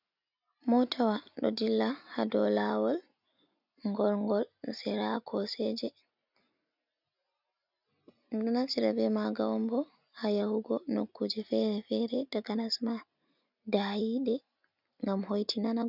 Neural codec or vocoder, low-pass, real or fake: none; 5.4 kHz; real